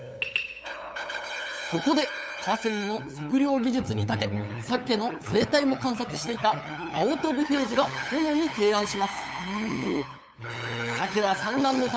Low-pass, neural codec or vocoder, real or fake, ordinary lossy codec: none; codec, 16 kHz, 8 kbps, FunCodec, trained on LibriTTS, 25 frames a second; fake; none